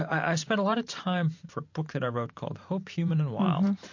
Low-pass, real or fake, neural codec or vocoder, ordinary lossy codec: 7.2 kHz; fake; vocoder, 44.1 kHz, 128 mel bands every 512 samples, BigVGAN v2; MP3, 48 kbps